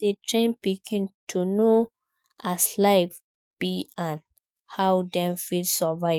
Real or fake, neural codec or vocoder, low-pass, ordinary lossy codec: fake; codec, 44.1 kHz, 7.8 kbps, DAC; 19.8 kHz; none